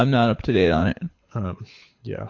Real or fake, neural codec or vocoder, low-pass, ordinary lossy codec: fake; codec, 24 kHz, 6 kbps, HILCodec; 7.2 kHz; MP3, 48 kbps